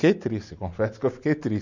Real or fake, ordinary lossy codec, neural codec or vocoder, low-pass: real; none; none; 7.2 kHz